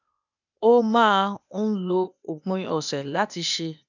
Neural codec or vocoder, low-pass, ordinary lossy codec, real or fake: codec, 16 kHz, 0.8 kbps, ZipCodec; 7.2 kHz; none; fake